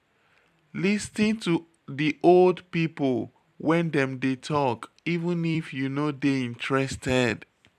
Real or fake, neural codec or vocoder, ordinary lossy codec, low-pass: fake; vocoder, 44.1 kHz, 128 mel bands every 256 samples, BigVGAN v2; none; 14.4 kHz